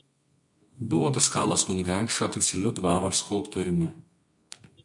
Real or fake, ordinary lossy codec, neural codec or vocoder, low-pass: fake; MP3, 64 kbps; codec, 24 kHz, 0.9 kbps, WavTokenizer, medium music audio release; 10.8 kHz